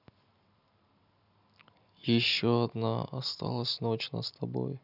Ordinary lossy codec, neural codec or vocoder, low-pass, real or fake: none; none; 5.4 kHz; real